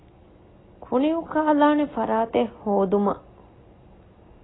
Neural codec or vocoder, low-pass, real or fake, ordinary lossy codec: none; 7.2 kHz; real; AAC, 16 kbps